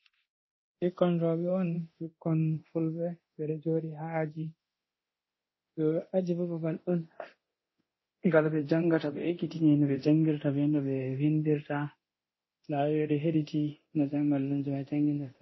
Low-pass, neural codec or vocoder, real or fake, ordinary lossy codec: 7.2 kHz; codec, 24 kHz, 0.9 kbps, DualCodec; fake; MP3, 24 kbps